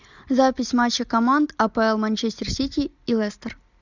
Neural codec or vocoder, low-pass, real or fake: none; 7.2 kHz; real